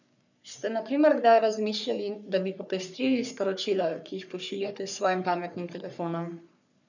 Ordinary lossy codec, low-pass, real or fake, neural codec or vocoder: none; 7.2 kHz; fake; codec, 44.1 kHz, 3.4 kbps, Pupu-Codec